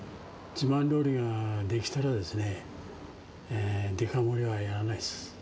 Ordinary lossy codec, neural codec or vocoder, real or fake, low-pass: none; none; real; none